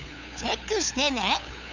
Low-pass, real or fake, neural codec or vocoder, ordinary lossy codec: 7.2 kHz; fake; codec, 16 kHz, 8 kbps, FunCodec, trained on LibriTTS, 25 frames a second; none